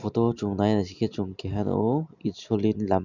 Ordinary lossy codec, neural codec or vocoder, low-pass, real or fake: none; none; 7.2 kHz; real